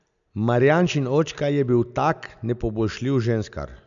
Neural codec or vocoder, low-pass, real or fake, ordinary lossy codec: none; 7.2 kHz; real; none